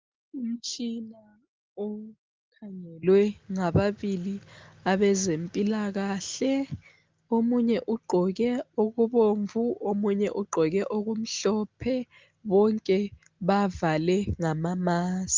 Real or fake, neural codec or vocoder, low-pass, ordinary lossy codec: real; none; 7.2 kHz; Opus, 32 kbps